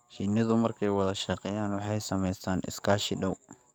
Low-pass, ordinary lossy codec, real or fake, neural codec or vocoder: none; none; fake; codec, 44.1 kHz, 7.8 kbps, DAC